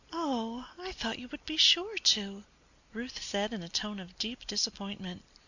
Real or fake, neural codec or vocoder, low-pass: real; none; 7.2 kHz